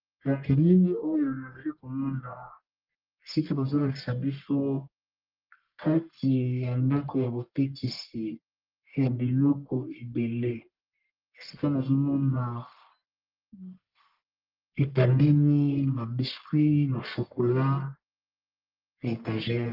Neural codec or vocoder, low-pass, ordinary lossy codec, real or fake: codec, 44.1 kHz, 1.7 kbps, Pupu-Codec; 5.4 kHz; Opus, 32 kbps; fake